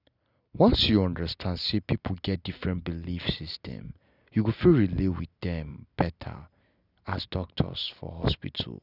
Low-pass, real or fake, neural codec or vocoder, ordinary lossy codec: 5.4 kHz; real; none; none